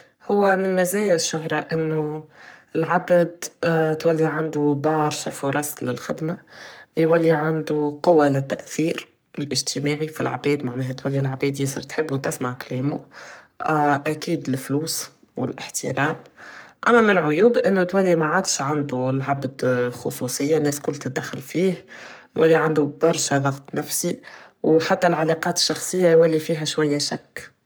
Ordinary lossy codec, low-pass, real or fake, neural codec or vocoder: none; none; fake; codec, 44.1 kHz, 3.4 kbps, Pupu-Codec